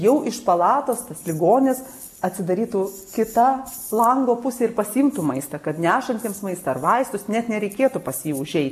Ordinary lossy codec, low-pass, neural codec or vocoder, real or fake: MP3, 64 kbps; 14.4 kHz; none; real